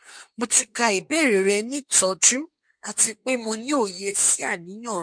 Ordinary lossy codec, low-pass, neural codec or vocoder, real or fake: MP3, 64 kbps; 9.9 kHz; codec, 24 kHz, 1 kbps, SNAC; fake